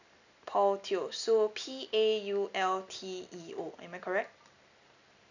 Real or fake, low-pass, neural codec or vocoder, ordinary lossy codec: real; 7.2 kHz; none; none